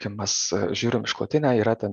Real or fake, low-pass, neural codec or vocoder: real; 9.9 kHz; none